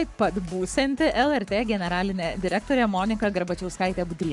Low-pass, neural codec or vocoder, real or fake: 10.8 kHz; codec, 44.1 kHz, 7.8 kbps, Pupu-Codec; fake